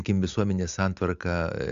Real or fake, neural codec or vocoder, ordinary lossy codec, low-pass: real; none; Opus, 64 kbps; 7.2 kHz